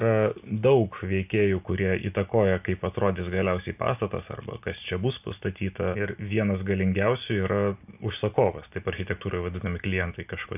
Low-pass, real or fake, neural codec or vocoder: 3.6 kHz; real; none